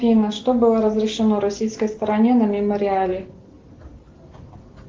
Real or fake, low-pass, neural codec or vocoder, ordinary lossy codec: real; 7.2 kHz; none; Opus, 16 kbps